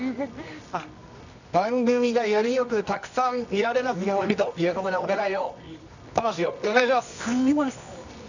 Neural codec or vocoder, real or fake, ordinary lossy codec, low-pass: codec, 24 kHz, 0.9 kbps, WavTokenizer, medium music audio release; fake; none; 7.2 kHz